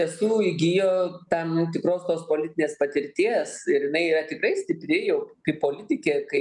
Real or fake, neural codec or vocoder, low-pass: real; none; 10.8 kHz